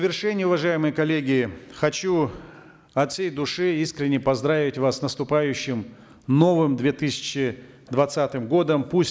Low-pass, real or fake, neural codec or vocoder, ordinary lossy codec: none; real; none; none